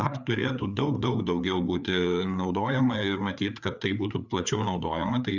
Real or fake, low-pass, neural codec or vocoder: fake; 7.2 kHz; codec, 16 kHz, 4 kbps, FreqCodec, larger model